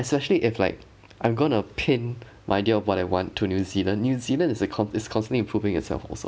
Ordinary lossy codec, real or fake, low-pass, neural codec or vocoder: none; real; none; none